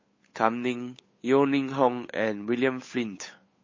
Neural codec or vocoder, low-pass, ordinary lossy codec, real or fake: codec, 16 kHz, 8 kbps, FunCodec, trained on Chinese and English, 25 frames a second; 7.2 kHz; MP3, 32 kbps; fake